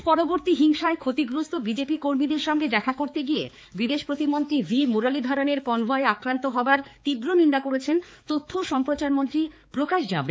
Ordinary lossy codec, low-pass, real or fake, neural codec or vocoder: none; none; fake; codec, 16 kHz, 4 kbps, X-Codec, HuBERT features, trained on balanced general audio